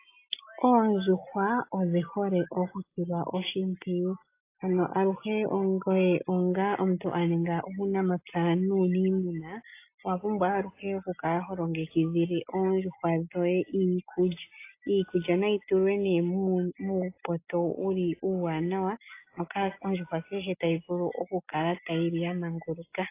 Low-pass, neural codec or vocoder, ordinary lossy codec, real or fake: 3.6 kHz; none; AAC, 24 kbps; real